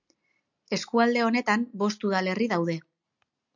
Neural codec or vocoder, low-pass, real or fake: none; 7.2 kHz; real